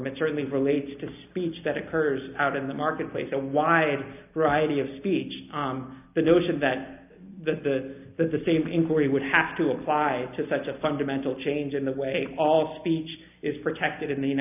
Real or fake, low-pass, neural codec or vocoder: real; 3.6 kHz; none